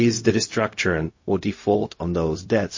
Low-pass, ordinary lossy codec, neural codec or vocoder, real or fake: 7.2 kHz; MP3, 32 kbps; codec, 16 kHz, 0.4 kbps, LongCat-Audio-Codec; fake